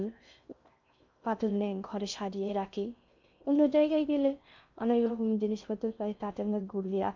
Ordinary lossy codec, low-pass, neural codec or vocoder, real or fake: MP3, 64 kbps; 7.2 kHz; codec, 16 kHz in and 24 kHz out, 0.6 kbps, FocalCodec, streaming, 2048 codes; fake